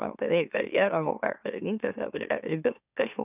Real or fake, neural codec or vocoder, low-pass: fake; autoencoder, 44.1 kHz, a latent of 192 numbers a frame, MeloTTS; 3.6 kHz